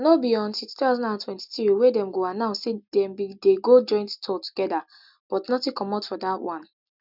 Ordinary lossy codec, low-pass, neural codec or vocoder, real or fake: none; 5.4 kHz; none; real